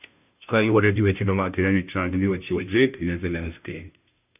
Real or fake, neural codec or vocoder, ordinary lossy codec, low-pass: fake; codec, 16 kHz, 0.5 kbps, FunCodec, trained on Chinese and English, 25 frames a second; none; 3.6 kHz